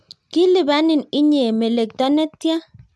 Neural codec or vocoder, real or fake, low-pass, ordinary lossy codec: none; real; none; none